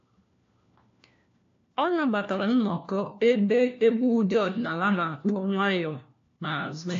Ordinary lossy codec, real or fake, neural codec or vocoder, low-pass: AAC, 48 kbps; fake; codec, 16 kHz, 1 kbps, FunCodec, trained on LibriTTS, 50 frames a second; 7.2 kHz